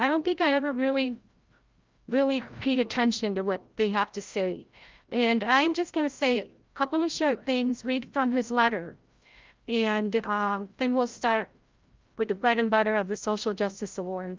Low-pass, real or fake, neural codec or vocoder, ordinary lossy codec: 7.2 kHz; fake; codec, 16 kHz, 0.5 kbps, FreqCodec, larger model; Opus, 24 kbps